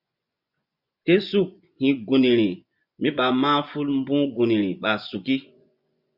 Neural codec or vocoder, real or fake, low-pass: vocoder, 24 kHz, 100 mel bands, Vocos; fake; 5.4 kHz